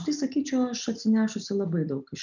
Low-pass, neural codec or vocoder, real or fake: 7.2 kHz; none; real